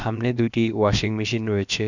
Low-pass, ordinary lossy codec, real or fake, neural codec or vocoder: 7.2 kHz; none; fake; codec, 16 kHz, about 1 kbps, DyCAST, with the encoder's durations